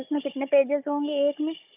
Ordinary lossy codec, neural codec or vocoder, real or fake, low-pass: none; codec, 16 kHz, 4 kbps, FunCodec, trained on Chinese and English, 50 frames a second; fake; 3.6 kHz